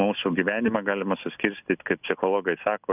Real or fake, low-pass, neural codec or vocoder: real; 3.6 kHz; none